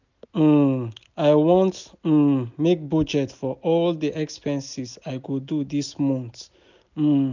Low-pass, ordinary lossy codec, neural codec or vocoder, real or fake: 7.2 kHz; none; none; real